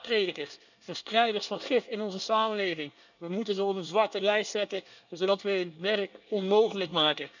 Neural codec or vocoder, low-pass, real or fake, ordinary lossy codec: codec, 24 kHz, 1 kbps, SNAC; 7.2 kHz; fake; none